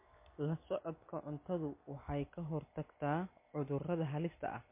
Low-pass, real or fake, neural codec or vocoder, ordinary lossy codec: 3.6 kHz; real; none; MP3, 24 kbps